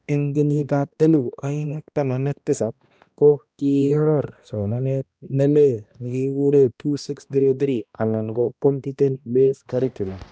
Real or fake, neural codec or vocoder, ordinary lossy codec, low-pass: fake; codec, 16 kHz, 1 kbps, X-Codec, HuBERT features, trained on balanced general audio; none; none